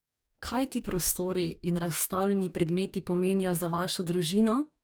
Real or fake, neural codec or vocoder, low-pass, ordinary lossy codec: fake; codec, 44.1 kHz, 2.6 kbps, DAC; none; none